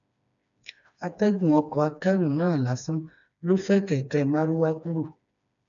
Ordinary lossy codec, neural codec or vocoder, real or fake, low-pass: none; codec, 16 kHz, 2 kbps, FreqCodec, smaller model; fake; 7.2 kHz